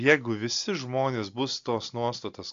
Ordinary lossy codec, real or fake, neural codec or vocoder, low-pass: AAC, 64 kbps; real; none; 7.2 kHz